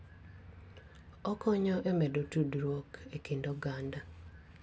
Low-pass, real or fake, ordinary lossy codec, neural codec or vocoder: none; real; none; none